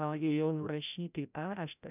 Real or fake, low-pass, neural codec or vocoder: fake; 3.6 kHz; codec, 16 kHz, 0.5 kbps, FreqCodec, larger model